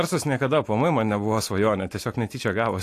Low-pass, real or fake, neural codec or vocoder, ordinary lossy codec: 14.4 kHz; real; none; AAC, 64 kbps